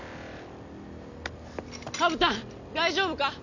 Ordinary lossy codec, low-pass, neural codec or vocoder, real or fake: none; 7.2 kHz; none; real